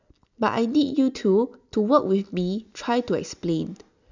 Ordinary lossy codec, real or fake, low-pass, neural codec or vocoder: none; real; 7.2 kHz; none